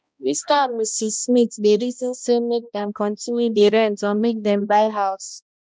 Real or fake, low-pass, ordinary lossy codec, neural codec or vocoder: fake; none; none; codec, 16 kHz, 1 kbps, X-Codec, HuBERT features, trained on balanced general audio